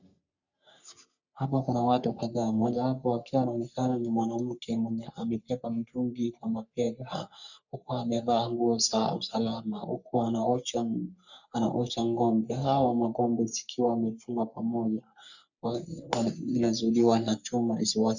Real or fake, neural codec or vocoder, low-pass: fake; codec, 44.1 kHz, 3.4 kbps, Pupu-Codec; 7.2 kHz